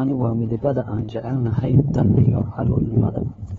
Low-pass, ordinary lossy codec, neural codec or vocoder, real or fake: 7.2 kHz; AAC, 24 kbps; codec, 16 kHz, 2 kbps, FunCodec, trained on LibriTTS, 25 frames a second; fake